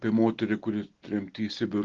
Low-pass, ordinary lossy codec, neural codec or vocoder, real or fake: 7.2 kHz; Opus, 16 kbps; none; real